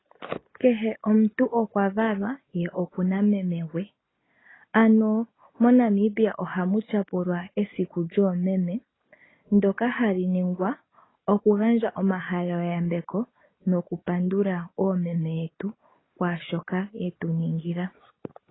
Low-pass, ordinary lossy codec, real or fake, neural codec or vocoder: 7.2 kHz; AAC, 16 kbps; real; none